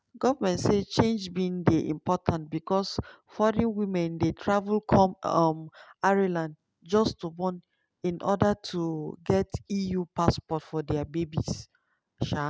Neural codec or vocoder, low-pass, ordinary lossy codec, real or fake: none; none; none; real